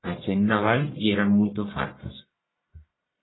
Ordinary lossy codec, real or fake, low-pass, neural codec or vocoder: AAC, 16 kbps; fake; 7.2 kHz; codec, 44.1 kHz, 1.7 kbps, Pupu-Codec